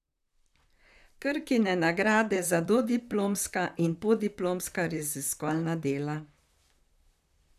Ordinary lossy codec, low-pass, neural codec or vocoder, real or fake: none; 14.4 kHz; vocoder, 44.1 kHz, 128 mel bands, Pupu-Vocoder; fake